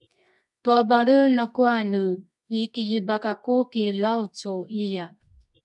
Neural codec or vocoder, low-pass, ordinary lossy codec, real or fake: codec, 24 kHz, 0.9 kbps, WavTokenizer, medium music audio release; 10.8 kHz; MP3, 96 kbps; fake